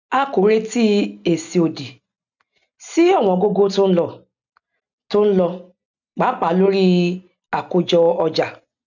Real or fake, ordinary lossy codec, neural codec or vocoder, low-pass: real; none; none; 7.2 kHz